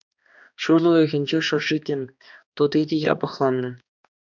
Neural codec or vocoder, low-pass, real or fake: codec, 16 kHz, 2 kbps, X-Codec, HuBERT features, trained on balanced general audio; 7.2 kHz; fake